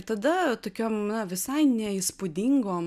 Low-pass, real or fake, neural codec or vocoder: 14.4 kHz; real; none